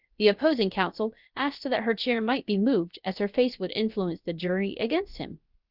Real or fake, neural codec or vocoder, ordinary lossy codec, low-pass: fake; codec, 16 kHz, about 1 kbps, DyCAST, with the encoder's durations; Opus, 24 kbps; 5.4 kHz